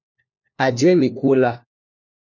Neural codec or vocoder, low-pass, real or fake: codec, 16 kHz, 1 kbps, FunCodec, trained on LibriTTS, 50 frames a second; 7.2 kHz; fake